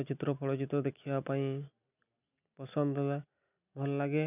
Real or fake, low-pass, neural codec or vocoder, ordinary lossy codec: real; 3.6 kHz; none; none